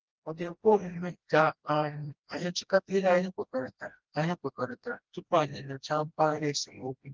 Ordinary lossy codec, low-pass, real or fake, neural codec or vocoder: Opus, 24 kbps; 7.2 kHz; fake; codec, 16 kHz, 1 kbps, FreqCodec, smaller model